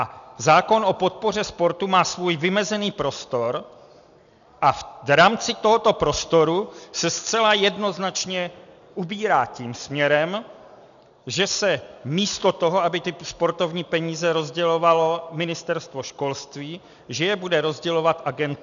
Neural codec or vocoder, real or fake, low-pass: none; real; 7.2 kHz